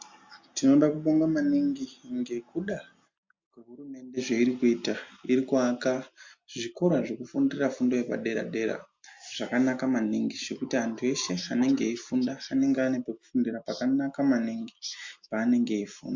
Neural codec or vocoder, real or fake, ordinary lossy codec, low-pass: none; real; MP3, 48 kbps; 7.2 kHz